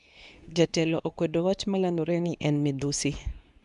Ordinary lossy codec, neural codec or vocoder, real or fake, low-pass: none; codec, 24 kHz, 0.9 kbps, WavTokenizer, medium speech release version 2; fake; 10.8 kHz